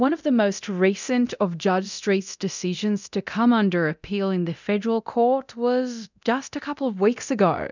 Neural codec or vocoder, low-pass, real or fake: codec, 24 kHz, 0.9 kbps, DualCodec; 7.2 kHz; fake